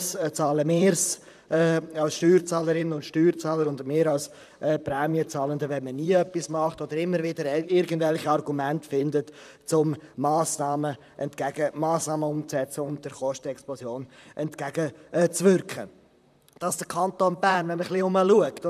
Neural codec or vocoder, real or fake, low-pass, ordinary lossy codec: vocoder, 44.1 kHz, 128 mel bands, Pupu-Vocoder; fake; 14.4 kHz; none